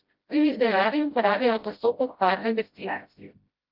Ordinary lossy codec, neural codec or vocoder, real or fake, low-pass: Opus, 32 kbps; codec, 16 kHz, 0.5 kbps, FreqCodec, smaller model; fake; 5.4 kHz